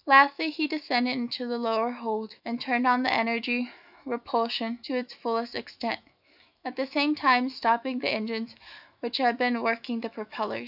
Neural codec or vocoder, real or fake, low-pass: autoencoder, 48 kHz, 128 numbers a frame, DAC-VAE, trained on Japanese speech; fake; 5.4 kHz